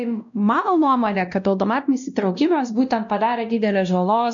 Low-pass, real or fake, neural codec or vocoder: 7.2 kHz; fake; codec, 16 kHz, 1 kbps, X-Codec, WavLM features, trained on Multilingual LibriSpeech